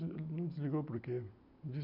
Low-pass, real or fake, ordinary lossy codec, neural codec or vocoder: 5.4 kHz; real; none; none